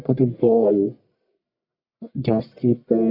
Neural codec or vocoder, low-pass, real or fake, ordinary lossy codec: codec, 44.1 kHz, 1.7 kbps, Pupu-Codec; 5.4 kHz; fake; none